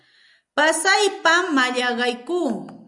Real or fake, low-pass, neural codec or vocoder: real; 10.8 kHz; none